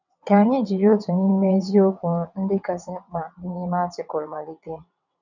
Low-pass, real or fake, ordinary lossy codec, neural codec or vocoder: 7.2 kHz; fake; none; vocoder, 22.05 kHz, 80 mel bands, WaveNeXt